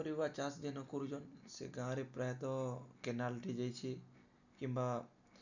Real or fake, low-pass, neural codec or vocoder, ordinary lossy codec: real; 7.2 kHz; none; none